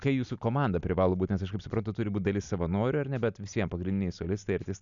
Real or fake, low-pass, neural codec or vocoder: real; 7.2 kHz; none